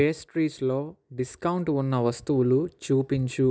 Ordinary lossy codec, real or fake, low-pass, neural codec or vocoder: none; real; none; none